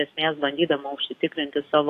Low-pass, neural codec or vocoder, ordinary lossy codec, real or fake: 14.4 kHz; codec, 44.1 kHz, 7.8 kbps, DAC; AAC, 64 kbps; fake